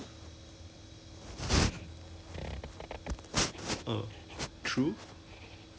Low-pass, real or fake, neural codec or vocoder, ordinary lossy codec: none; real; none; none